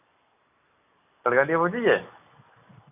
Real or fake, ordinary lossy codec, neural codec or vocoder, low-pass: real; AAC, 32 kbps; none; 3.6 kHz